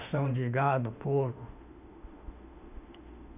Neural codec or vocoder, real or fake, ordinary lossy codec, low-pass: autoencoder, 48 kHz, 32 numbers a frame, DAC-VAE, trained on Japanese speech; fake; none; 3.6 kHz